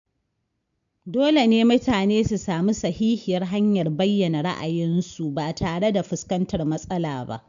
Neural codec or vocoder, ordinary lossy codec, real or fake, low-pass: none; MP3, 64 kbps; real; 7.2 kHz